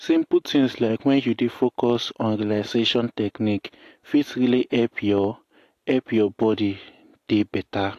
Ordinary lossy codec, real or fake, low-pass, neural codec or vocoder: AAC, 64 kbps; real; 14.4 kHz; none